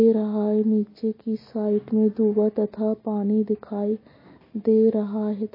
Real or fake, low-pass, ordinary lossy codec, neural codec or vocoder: real; 5.4 kHz; MP3, 24 kbps; none